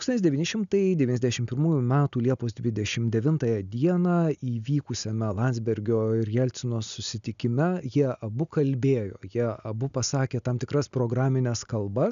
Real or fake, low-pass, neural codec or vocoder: real; 7.2 kHz; none